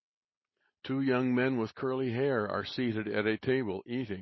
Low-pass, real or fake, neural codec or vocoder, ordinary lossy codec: 7.2 kHz; real; none; MP3, 24 kbps